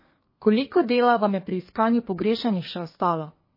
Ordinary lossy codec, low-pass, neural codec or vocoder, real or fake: MP3, 24 kbps; 5.4 kHz; codec, 32 kHz, 1.9 kbps, SNAC; fake